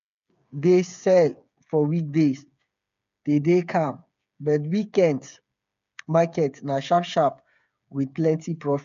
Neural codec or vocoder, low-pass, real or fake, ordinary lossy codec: codec, 16 kHz, 8 kbps, FreqCodec, smaller model; 7.2 kHz; fake; MP3, 64 kbps